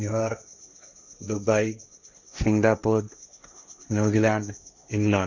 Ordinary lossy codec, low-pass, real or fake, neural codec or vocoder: none; 7.2 kHz; fake; codec, 16 kHz, 1.1 kbps, Voila-Tokenizer